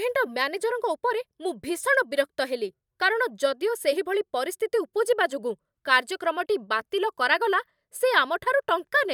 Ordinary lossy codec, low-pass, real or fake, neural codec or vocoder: none; 19.8 kHz; fake; vocoder, 44.1 kHz, 128 mel bands every 512 samples, BigVGAN v2